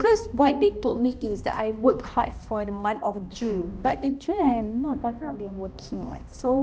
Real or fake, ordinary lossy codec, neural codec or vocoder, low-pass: fake; none; codec, 16 kHz, 1 kbps, X-Codec, HuBERT features, trained on balanced general audio; none